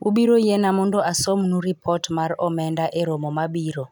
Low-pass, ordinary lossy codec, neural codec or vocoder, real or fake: 19.8 kHz; none; none; real